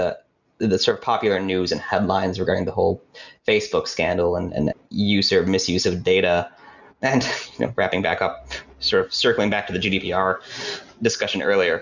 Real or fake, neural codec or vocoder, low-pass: real; none; 7.2 kHz